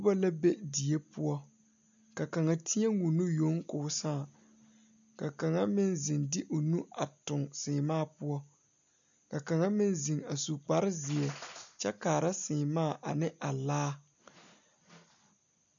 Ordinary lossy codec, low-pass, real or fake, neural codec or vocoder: MP3, 96 kbps; 7.2 kHz; real; none